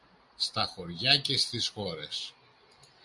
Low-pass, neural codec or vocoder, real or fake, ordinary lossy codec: 10.8 kHz; none; real; MP3, 96 kbps